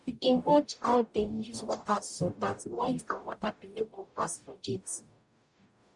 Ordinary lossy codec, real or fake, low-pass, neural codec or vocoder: none; fake; 10.8 kHz; codec, 44.1 kHz, 0.9 kbps, DAC